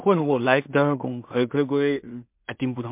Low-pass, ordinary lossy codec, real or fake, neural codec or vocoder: 3.6 kHz; MP3, 32 kbps; fake; codec, 16 kHz in and 24 kHz out, 0.4 kbps, LongCat-Audio-Codec, two codebook decoder